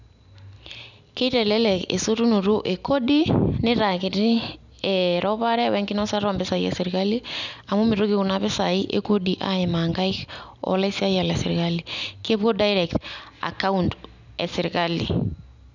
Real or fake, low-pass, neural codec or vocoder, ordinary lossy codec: real; 7.2 kHz; none; none